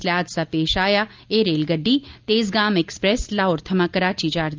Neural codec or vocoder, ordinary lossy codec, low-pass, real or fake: none; Opus, 32 kbps; 7.2 kHz; real